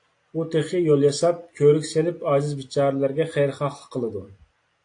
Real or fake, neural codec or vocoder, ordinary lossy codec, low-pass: real; none; AAC, 48 kbps; 9.9 kHz